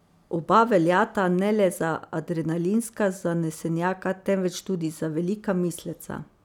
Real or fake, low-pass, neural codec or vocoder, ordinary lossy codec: real; 19.8 kHz; none; none